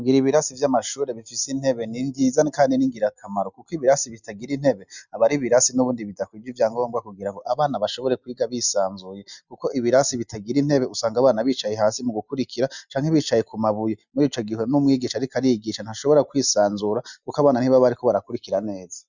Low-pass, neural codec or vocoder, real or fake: 7.2 kHz; none; real